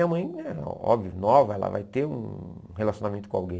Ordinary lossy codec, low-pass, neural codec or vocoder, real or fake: none; none; none; real